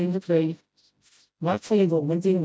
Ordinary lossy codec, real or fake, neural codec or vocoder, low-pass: none; fake; codec, 16 kHz, 0.5 kbps, FreqCodec, smaller model; none